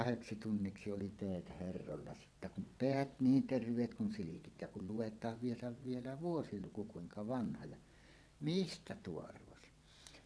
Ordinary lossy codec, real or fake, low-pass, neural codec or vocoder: none; fake; none; vocoder, 22.05 kHz, 80 mel bands, WaveNeXt